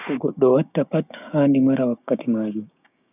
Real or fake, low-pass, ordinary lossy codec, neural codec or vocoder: fake; 3.6 kHz; none; codec, 16 kHz, 6 kbps, DAC